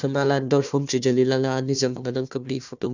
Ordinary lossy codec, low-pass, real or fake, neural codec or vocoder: none; 7.2 kHz; fake; codec, 16 kHz, 1 kbps, FunCodec, trained on Chinese and English, 50 frames a second